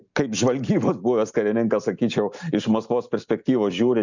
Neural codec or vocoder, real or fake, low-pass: none; real; 7.2 kHz